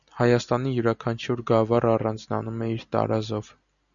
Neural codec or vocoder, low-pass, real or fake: none; 7.2 kHz; real